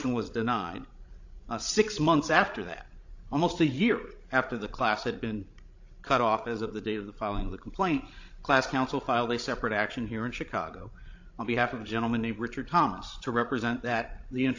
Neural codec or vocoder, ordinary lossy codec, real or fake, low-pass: codec, 16 kHz, 16 kbps, FreqCodec, larger model; AAC, 48 kbps; fake; 7.2 kHz